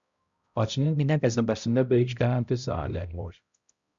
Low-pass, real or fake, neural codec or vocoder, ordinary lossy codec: 7.2 kHz; fake; codec, 16 kHz, 0.5 kbps, X-Codec, HuBERT features, trained on balanced general audio; Opus, 64 kbps